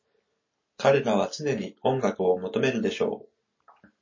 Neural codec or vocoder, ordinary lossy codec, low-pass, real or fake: none; MP3, 32 kbps; 7.2 kHz; real